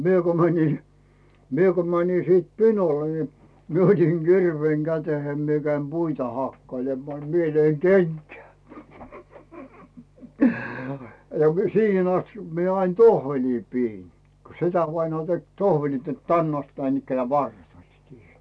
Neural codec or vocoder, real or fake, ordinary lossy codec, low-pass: none; real; none; none